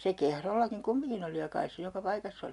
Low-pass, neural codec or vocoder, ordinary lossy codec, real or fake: 10.8 kHz; none; none; real